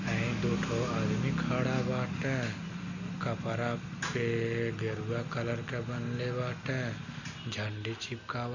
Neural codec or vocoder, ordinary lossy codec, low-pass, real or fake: none; none; 7.2 kHz; real